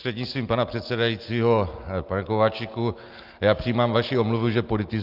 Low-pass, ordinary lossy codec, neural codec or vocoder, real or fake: 5.4 kHz; Opus, 32 kbps; none; real